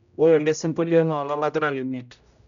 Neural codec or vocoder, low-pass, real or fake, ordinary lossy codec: codec, 16 kHz, 0.5 kbps, X-Codec, HuBERT features, trained on general audio; 7.2 kHz; fake; none